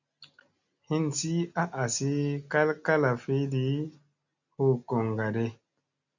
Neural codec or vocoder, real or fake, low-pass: none; real; 7.2 kHz